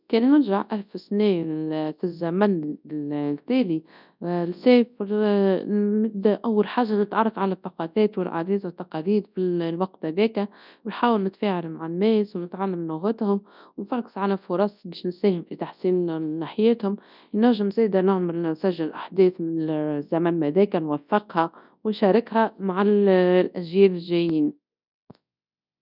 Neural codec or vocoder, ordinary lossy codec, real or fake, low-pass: codec, 24 kHz, 0.9 kbps, WavTokenizer, large speech release; none; fake; 5.4 kHz